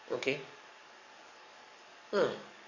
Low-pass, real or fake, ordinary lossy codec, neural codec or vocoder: 7.2 kHz; fake; none; codec, 44.1 kHz, 7.8 kbps, DAC